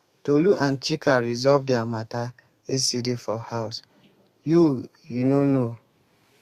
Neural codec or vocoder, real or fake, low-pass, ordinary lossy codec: codec, 32 kHz, 1.9 kbps, SNAC; fake; 14.4 kHz; Opus, 64 kbps